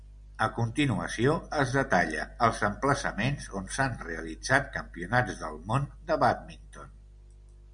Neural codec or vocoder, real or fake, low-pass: none; real; 9.9 kHz